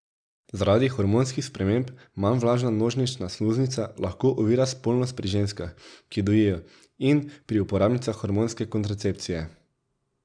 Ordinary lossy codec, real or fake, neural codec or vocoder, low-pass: none; real; none; 9.9 kHz